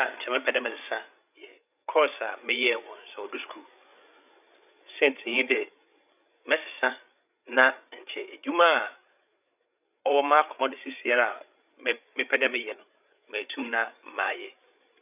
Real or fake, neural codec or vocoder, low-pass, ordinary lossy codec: fake; codec, 16 kHz, 8 kbps, FreqCodec, larger model; 3.6 kHz; none